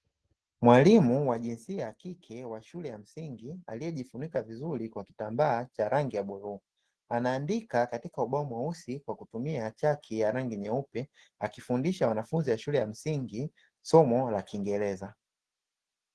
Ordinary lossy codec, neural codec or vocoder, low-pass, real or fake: Opus, 16 kbps; none; 10.8 kHz; real